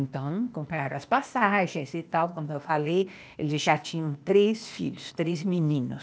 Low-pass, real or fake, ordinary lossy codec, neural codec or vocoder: none; fake; none; codec, 16 kHz, 0.8 kbps, ZipCodec